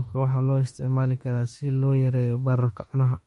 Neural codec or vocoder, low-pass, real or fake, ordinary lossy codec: autoencoder, 48 kHz, 32 numbers a frame, DAC-VAE, trained on Japanese speech; 19.8 kHz; fake; MP3, 48 kbps